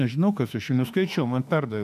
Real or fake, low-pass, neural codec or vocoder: fake; 14.4 kHz; autoencoder, 48 kHz, 32 numbers a frame, DAC-VAE, trained on Japanese speech